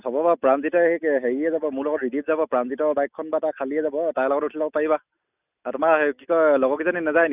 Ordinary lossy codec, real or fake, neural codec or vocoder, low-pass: none; real; none; 3.6 kHz